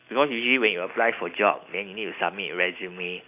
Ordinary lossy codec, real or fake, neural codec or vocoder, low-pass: none; real; none; 3.6 kHz